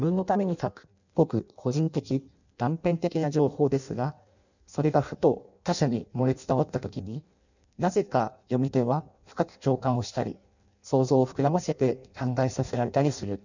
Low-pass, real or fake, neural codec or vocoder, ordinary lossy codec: 7.2 kHz; fake; codec, 16 kHz in and 24 kHz out, 0.6 kbps, FireRedTTS-2 codec; none